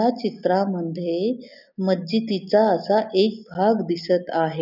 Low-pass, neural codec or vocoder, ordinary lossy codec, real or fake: 5.4 kHz; none; none; real